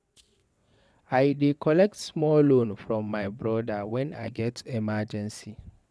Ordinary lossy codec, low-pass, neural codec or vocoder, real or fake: none; none; vocoder, 22.05 kHz, 80 mel bands, WaveNeXt; fake